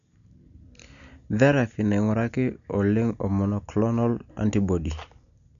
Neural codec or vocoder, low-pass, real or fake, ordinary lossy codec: none; 7.2 kHz; real; none